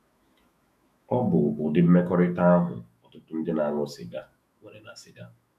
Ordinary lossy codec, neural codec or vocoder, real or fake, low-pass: MP3, 96 kbps; autoencoder, 48 kHz, 128 numbers a frame, DAC-VAE, trained on Japanese speech; fake; 14.4 kHz